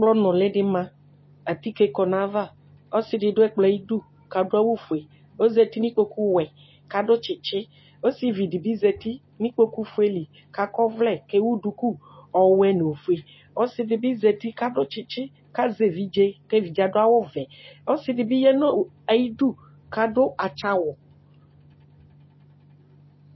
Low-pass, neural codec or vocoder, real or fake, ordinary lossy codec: 7.2 kHz; none; real; MP3, 24 kbps